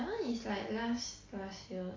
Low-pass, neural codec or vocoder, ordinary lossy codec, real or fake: 7.2 kHz; none; AAC, 32 kbps; real